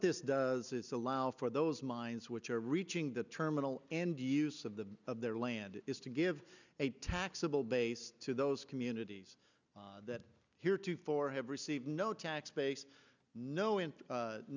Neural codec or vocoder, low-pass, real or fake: none; 7.2 kHz; real